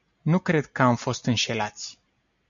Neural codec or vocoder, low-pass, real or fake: none; 7.2 kHz; real